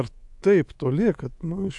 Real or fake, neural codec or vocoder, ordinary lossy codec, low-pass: fake; codec, 24 kHz, 3.1 kbps, DualCodec; AAC, 64 kbps; 10.8 kHz